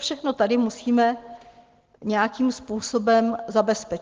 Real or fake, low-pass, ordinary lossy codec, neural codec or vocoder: real; 7.2 kHz; Opus, 16 kbps; none